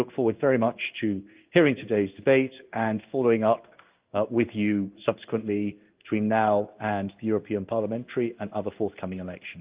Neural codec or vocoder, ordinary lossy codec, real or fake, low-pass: codec, 16 kHz in and 24 kHz out, 1 kbps, XY-Tokenizer; Opus, 32 kbps; fake; 3.6 kHz